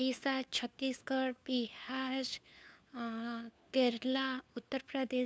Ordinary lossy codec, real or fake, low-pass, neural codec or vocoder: none; fake; none; codec, 16 kHz, 4 kbps, FunCodec, trained on LibriTTS, 50 frames a second